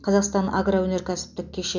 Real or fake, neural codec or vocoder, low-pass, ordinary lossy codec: real; none; 7.2 kHz; none